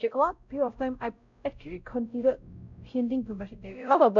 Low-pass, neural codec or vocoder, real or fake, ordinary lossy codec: 7.2 kHz; codec, 16 kHz, 0.5 kbps, X-Codec, WavLM features, trained on Multilingual LibriSpeech; fake; none